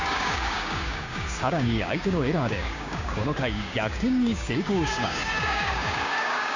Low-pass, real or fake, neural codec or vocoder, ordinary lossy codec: 7.2 kHz; fake; codec, 16 kHz, 6 kbps, DAC; AAC, 48 kbps